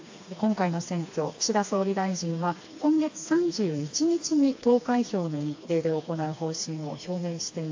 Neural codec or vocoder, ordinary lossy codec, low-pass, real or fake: codec, 16 kHz, 2 kbps, FreqCodec, smaller model; AAC, 48 kbps; 7.2 kHz; fake